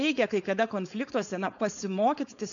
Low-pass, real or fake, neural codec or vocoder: 7.2 kHz; fake; codec, 16 kHz, 4.8 kbps, FACodec